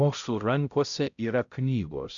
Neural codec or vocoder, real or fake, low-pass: codec, 16 kHz, 0.5 kbps, X-Codec, HuBERT features, trained on balanced general audio; fake; 7.2 kHz